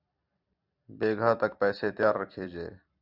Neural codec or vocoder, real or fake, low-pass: vocoder, 44.1 kHz, 128 mel bands every 256 samples, BigVGAN v2; fake; 5.4 kHz